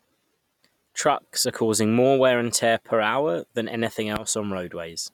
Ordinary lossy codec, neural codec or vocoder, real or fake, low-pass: none; none; real; 19.8 kHz